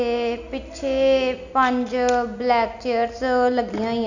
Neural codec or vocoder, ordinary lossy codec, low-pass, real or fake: none; none; 7.2 kHz; real